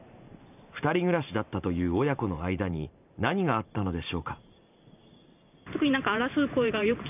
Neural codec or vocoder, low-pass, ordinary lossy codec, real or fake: vocoder, 44.1 kHz, 128 mel bands every 512 samples, BigVGAN v2; 3.6 kHz; none; fake